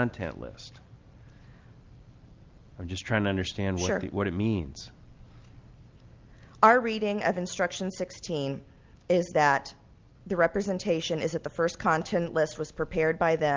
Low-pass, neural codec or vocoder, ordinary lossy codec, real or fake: 7.2 kHz; none; Opus, 32 kbps; real